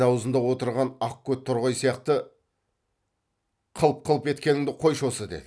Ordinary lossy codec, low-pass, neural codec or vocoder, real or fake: none; none; none; real